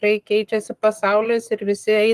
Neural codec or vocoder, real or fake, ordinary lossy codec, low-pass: codec, 44.1 kHz, 7.8 kbps, Pupu-Codec; fake; Opus, 24 kbps; 14.4 kHz